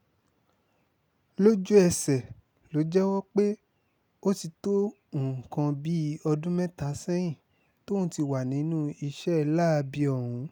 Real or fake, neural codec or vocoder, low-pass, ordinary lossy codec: real; none; none; none